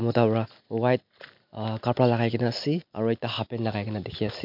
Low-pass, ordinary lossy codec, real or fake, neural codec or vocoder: 5.4 kHz; MP3, 48 kbps; real; none